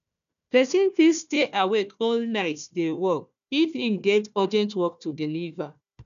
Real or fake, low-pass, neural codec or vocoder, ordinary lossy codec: fake; 7.2 kHz; codec, 16 kHz, 1 kbps, FunCodec, trained on Chinese and English, 50 frames a second; none